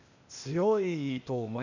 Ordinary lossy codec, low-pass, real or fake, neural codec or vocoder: none; 7.2 kHz; fake; codec, 16 kHz, 0.8 kbps, ZipCodec